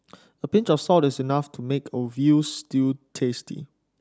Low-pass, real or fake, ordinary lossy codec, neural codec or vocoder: none; real; none; none